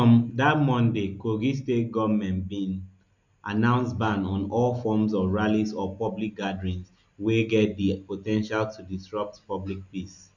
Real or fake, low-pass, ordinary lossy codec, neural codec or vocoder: real; 7.2 kHz; none; none